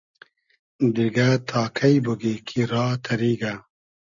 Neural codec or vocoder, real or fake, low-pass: none; real; 7.2 kHz